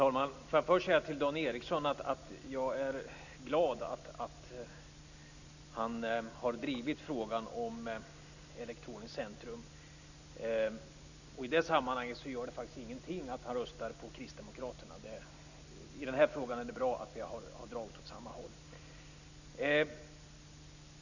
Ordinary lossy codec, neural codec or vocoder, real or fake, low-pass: none; none; real; 7.2 kHz